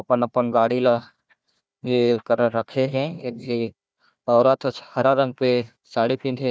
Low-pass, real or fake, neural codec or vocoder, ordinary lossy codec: none; fake; codec, 16 kHz, 1 kbps, FunCodec, trained on Chinese and English, 50 frames a second; none